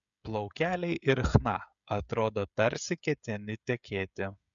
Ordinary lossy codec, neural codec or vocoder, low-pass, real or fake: MP3, 96 kbps; codec, 16 kHz, 16 kbps, FreqCodec, smaller model; 7.2 kHz; fake